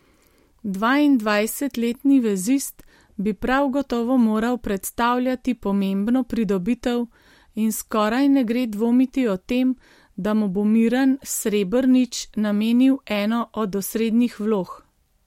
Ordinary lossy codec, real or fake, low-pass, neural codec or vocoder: MP3, 64 kbps; real; 19.8 kHz; none